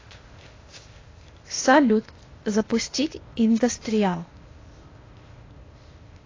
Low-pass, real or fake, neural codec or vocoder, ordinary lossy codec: 7.2 kHz; fake; codec, 16 kHz, 0.8 kbps, ZipCodec; AAC, 32 kbps